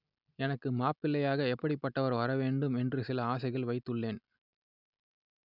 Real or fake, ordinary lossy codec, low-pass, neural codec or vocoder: real; none; 5.4 kHz; none